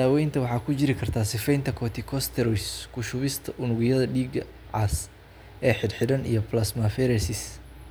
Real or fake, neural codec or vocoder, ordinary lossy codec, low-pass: real; none; none; none